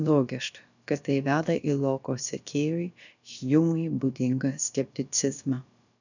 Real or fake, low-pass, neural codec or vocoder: fake; 7.2 kHz; codec, 16 kHz, about 1 kbps, DyCAST, with the encoder's durations